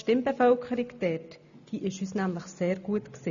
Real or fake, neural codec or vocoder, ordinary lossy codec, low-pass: real; none; none; 7.2 kHz